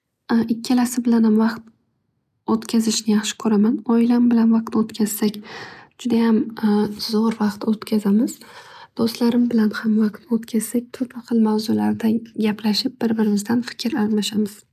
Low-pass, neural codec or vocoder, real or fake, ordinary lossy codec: 14.4 kHz; none; real; none